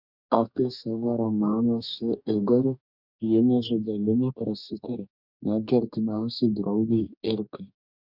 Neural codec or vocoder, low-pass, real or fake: codec, 44.1 kHz, 2.6 kbps, DAC; 5.4 kHz; fake